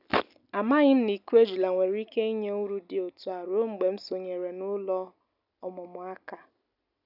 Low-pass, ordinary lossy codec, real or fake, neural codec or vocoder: 5.4 kHz; none; real; none